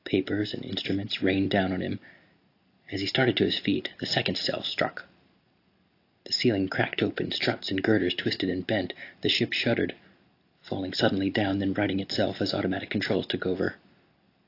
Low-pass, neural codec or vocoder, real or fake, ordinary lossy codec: 5.4 kHz; none; real; AAC, 32 kbps